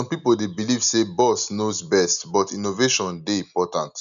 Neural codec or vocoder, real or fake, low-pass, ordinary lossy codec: none; real; 7.2 kHz; none